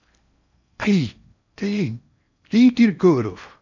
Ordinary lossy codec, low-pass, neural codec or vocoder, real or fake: none; 7.2 kHz; codec, 16 kHz in and 24 kHz out, 0.6 kbps, FocalCodec, streaming, 4096 codes; fake